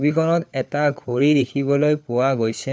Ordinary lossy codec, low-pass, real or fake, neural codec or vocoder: none; none; fake; codec, 16 kHz, 4 kbps, FunCodec, trained on LibriTTS, 50 frames a second